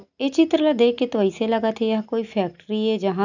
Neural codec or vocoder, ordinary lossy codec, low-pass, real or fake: none; none; 7.2 kHz; real